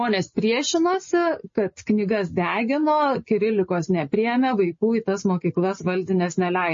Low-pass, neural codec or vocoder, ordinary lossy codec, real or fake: 7.2 kHz; none; MP3, 32 kbps; real